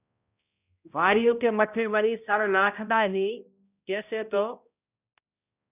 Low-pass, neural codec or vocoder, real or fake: 3.6 kHz; codec, 16 kHz, 0.5 kbps, X-Codec, HuBERT features, trained on balanced general audio; fake